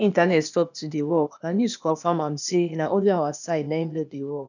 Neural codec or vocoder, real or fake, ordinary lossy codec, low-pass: codec, 16 kHz, 0.8 kbps, ZipCodec; fake; none; 7.2 kHz